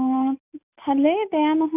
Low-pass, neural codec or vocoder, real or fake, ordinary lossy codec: 3.6 kHz; none; real; none